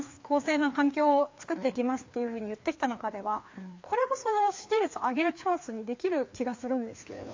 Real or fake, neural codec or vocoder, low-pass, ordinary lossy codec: fake; codec, 16 kHz, 2 kbps, FreqCodec, larger model; 7.2 kHz; MP3, 48 kbps